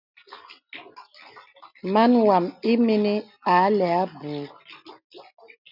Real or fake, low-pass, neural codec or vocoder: real; 5.4 kHz; none